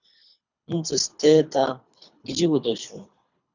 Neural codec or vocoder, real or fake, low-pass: codec, 24 kHz, 3 kbps, HILCodec; fake; 7.2 kHz